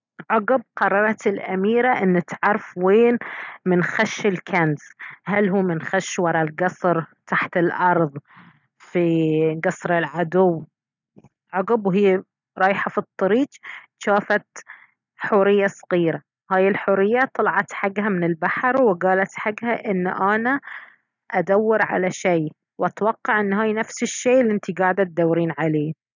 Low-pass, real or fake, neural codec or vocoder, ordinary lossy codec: 7.2 kHz; real; none; none